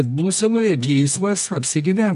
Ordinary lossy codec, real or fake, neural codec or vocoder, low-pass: AAC, 96 kbps; fake; codec, 24 kHz, 0.9 kbps, WavTokenizer, medium music audio release; 10.8 kHz